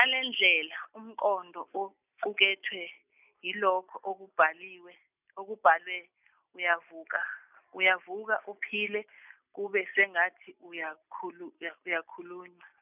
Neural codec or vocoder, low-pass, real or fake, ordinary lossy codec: none; 3.6 kHz; real; none